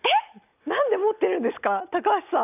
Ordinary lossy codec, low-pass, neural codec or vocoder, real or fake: none; 3.6 kHz; none; real